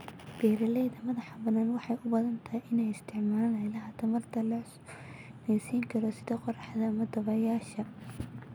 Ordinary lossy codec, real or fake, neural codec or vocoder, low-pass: none; real; none; none